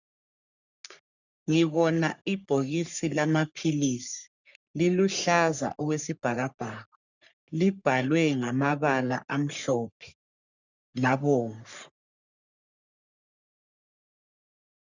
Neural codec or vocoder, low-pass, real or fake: codec, 44.1 kHz, 3.4 kbps, Pupu-Codec; 7.2 kHz; fake